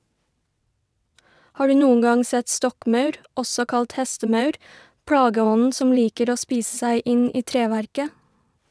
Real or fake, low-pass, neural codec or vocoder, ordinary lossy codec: fake; none; vocoder, 22.05 kHz, 80 mel bands, WaveNeXt; none